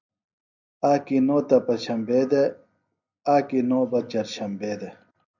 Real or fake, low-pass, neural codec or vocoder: real; 7.2 kHz; none